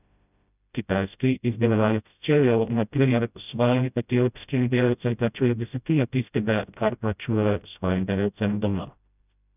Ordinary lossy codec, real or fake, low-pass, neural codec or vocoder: none; fake; 3.6 kHz; codec, 16 kHz, 0.5 kbps, FreqCodec, smaller model